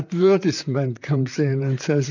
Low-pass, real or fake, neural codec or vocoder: 7.2 kHz; fake; vocoder, 44.1 kHz, 128 mel bands, Pupu-Vocoder